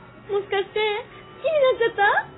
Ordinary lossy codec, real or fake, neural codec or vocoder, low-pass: AAC, 16 kbps; real; none; 7.2 kHz